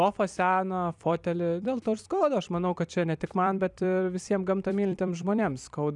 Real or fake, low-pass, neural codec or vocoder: fake; 10.8 kHz; vocoder, 44.1 kHz, 128 mel bands every 256 samples, BigVGAN v2